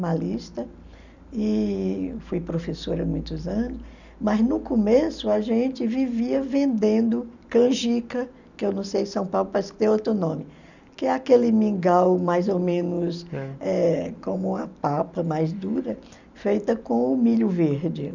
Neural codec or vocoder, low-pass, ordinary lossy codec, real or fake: none; 7.2 kHz; none; real